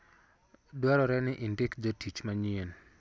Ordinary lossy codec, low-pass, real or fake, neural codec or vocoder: none; none; real; none